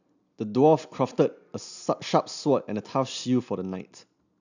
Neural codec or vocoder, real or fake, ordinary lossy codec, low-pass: none; real; none; 7.2 kHz